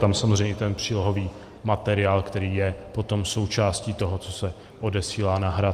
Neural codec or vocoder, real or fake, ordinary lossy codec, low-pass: none; real; Opus, 32 kbps; 14.4 kHz